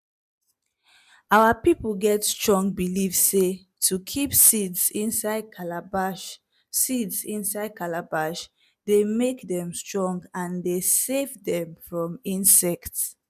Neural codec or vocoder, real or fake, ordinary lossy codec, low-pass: none; real; none; 14.4 kHz